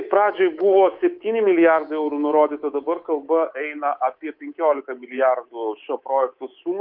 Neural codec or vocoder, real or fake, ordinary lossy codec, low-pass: codec, 24 kHz, 3.1 kbps, DualCodec; fake; Opus, 24 kbps; 5.4 kHz